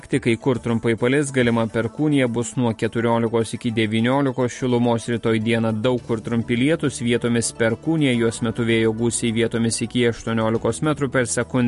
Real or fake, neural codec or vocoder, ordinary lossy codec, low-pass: real; none; MP3, 48 kbps; 14.4 kHz